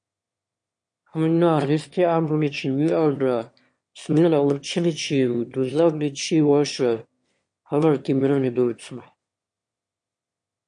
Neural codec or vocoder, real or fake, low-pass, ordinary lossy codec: autoencoder, 22.05 kHz, a latent of 192 numbers a frame, VITS, trained on one speaker; fake; 9.9 kHz; MP3, 48 kbps